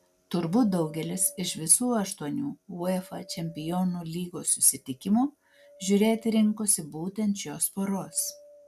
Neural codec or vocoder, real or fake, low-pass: none; real; 14.4 kHz